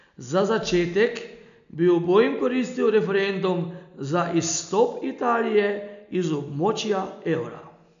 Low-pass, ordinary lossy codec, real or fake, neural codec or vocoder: 7.2 kHz; none; real; none